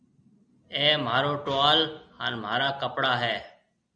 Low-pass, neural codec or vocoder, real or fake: 9.9 kHz; none; real